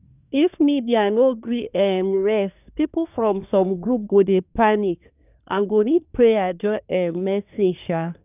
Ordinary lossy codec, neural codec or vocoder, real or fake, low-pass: none; codec, 24 kHz, 1 kbps, SNAC; fake; 3.6 kHz